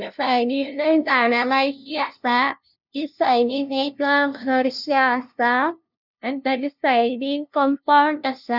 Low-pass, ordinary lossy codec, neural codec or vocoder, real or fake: 5.4 kHz; none; codec, 16 kHz, 0.5 kbps, FunCodec, trained on LibriTTS, 25 frames a second; fake